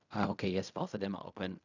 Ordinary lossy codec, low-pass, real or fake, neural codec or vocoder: none; 7.2 kHz; fake; codec, 16 kHz in and 24 kHz out, 0.4 kbps, LongCat-Audio-Codec, fine tuned four codebook decoder